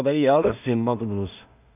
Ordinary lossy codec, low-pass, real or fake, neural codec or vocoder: none; 3.6 kHz; fake; codec, 16 kHz in and 24 kHz out, 0.4 kbps, LongCat-Audio-Codec, two codebook decoder